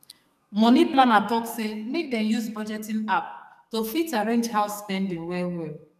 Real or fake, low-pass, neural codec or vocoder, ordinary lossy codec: fake; 14.4 kHz; codec, 44.1 kHz, 2.6 kbps, SNAC; none